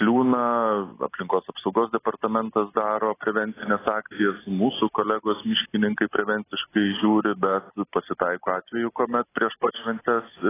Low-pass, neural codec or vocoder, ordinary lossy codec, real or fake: 3.6 kHz; none; AAC, 16 kbps; real